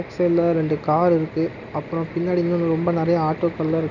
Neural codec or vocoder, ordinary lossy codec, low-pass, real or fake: none; none; 7.2 kHz; real